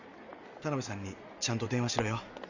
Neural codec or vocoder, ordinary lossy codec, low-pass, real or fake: none; none; 7.2 kHz; real